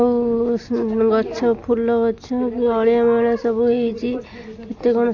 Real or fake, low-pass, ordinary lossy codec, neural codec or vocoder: real; 7.2 kHz; AAC, 48 kbps; none